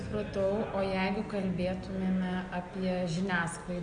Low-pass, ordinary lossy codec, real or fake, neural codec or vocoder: 9.9 kHz; MP3, 48 kbps; real; none